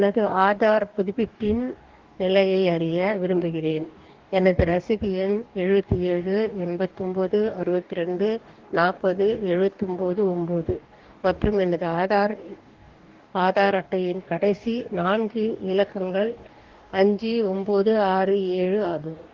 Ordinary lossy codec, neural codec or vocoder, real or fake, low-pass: Opus, 16 kbps; codec, 44.1 kHz, 2.6 kbps, DAC; fake; 7.2 kHz